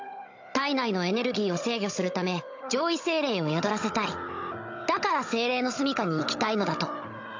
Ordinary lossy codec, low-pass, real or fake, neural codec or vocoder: AAC, 48 kbps; 7.2 kHz; fake; codec, 16 kHz, 16 kbps, FunCodec, trained on Chinese and English, 50 frames a second